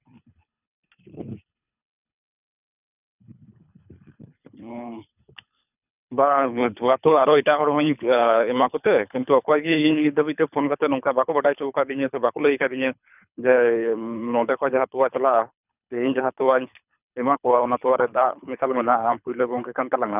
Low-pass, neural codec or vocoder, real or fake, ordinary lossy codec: 3.6 kHz; codec, 24 kHz, 3 kbps, HILCodec; fake; none